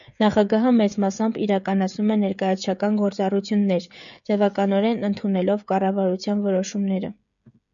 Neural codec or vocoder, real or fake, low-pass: codec, 16 kHz, 16 kbps, FreqCodec, smaller model; fake; 7.2 kHz